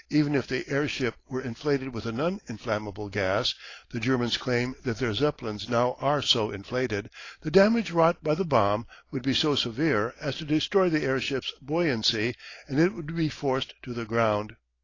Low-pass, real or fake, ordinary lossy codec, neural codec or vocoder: 7.2 kHz; real; AAC, 32 kbps; none